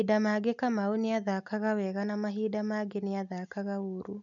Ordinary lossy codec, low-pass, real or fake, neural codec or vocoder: none; 7.2 kHz; real; none